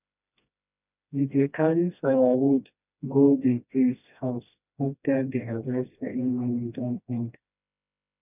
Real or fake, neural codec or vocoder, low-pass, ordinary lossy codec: fake; codec, 16 kHz, 1 kbps, FreqCodec, smaller model; 3.6 kHz; none